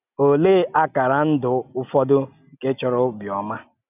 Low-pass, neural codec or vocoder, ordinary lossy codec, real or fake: 3.6 kHz; none; none; real